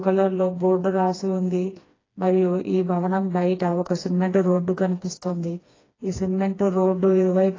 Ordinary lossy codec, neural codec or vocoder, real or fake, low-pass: AAC, 32 kbps; codec, 16 kHz, 2 kbps, FreqCodec, smaller model; fake; 7.2 kHz